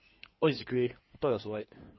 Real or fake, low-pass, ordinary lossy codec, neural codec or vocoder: fake; 7.2 kHz; MP3, 24 kbps; codec, 16 kHz in and 24 kHz out, 2.2 kbps, FireRedTTS-2 codec